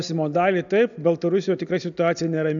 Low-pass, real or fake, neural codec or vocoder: 7.2 kHz; real; none